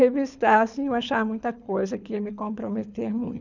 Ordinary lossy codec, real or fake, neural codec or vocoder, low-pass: none; fake; codec, 24 kHz, 6 kbps, HILCodec; 7.2 kHz